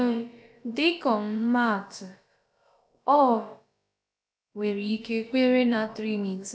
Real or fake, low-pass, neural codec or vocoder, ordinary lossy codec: fake; none; codec, 16 kHz, about 1 kbps, DyCAST, with the encoder's durations; none